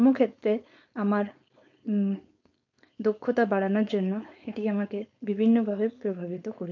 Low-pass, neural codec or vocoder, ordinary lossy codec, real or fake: 7.2 kHz; codec, 16 kHz, 4.8 kbps, FACodec; MP3, 48 kbps; fake